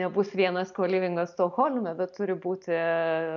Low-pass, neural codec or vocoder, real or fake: 7.2 kHz; none; real